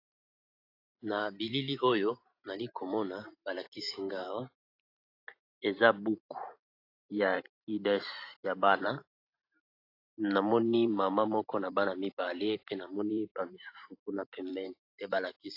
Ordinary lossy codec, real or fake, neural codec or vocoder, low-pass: AAC, 32 kbps; fake; vocoder, 44.1 kHz, 128 mel bands every 512 samples, BigVGAN v2; 5.4 kHz